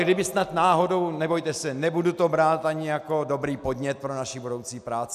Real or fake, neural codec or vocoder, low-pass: real; none; 14.4 kHz